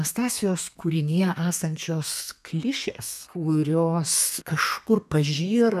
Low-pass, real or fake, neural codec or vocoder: 14.4 kHz; fake; codec, 32 kHz, 1.9 kbps, SNAC